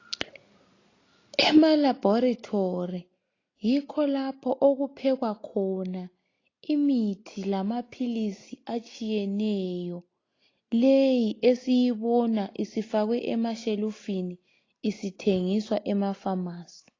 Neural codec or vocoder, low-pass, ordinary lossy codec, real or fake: none; 7.2 kHz; AAC, 32 kbps; real